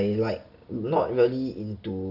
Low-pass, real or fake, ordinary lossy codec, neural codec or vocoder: 5.4 kHz; real; none; none